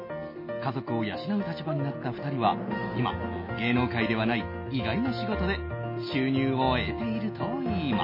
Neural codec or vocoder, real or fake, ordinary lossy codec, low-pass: none; real; MP3, 24 kbps; 5.4 kHz